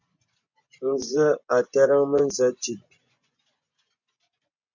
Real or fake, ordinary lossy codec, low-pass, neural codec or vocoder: fake; MP3, 48 kbps; 7.2 kHz; vocoder, 24 kHz, 100 mel bands, Vocos